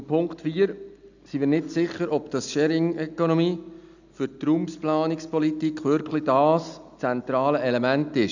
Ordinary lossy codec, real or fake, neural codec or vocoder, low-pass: none; real; none; 7.2 kHz